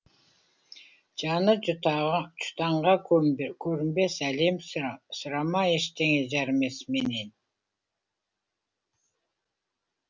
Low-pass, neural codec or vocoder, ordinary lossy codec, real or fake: none; none; none; real